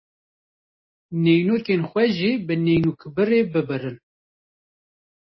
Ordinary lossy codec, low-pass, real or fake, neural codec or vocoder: MP3, 24 kbps; 7.2 kHz; real; none